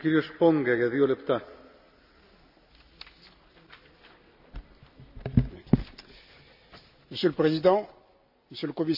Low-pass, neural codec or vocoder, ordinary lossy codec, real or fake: 5.4 kHz; none; none; real